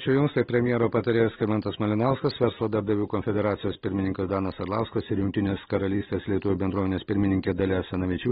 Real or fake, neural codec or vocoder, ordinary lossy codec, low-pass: real; none; AAC, 16 kbps; 7.2 kHz